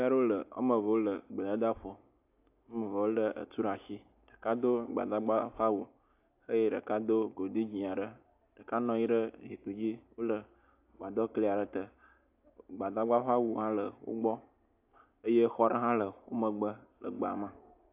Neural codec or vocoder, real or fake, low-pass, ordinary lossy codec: none; real; 3.6 kHz; AAC, 32 kbps